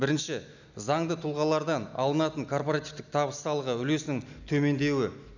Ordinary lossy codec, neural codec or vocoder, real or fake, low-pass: none; none; real; 7.2 kHz